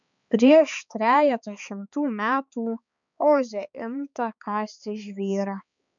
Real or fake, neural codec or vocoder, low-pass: fake; codec, 16 kHz, 4 kbps, X-Codec, HuBERT features, trained on balanced general audio; 7.2 kHz